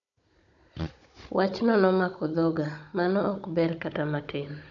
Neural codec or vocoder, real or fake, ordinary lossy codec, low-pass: codec, 16 kHz, 16 kbps, FunCodec, trained on Chinese and English, 50 frames a second; fake; none; 7.2 kHz